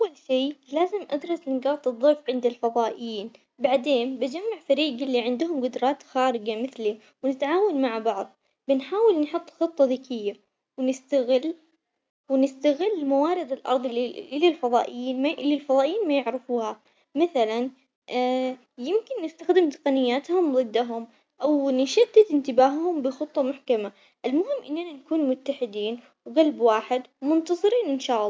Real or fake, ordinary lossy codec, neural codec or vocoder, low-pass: real; none; none; none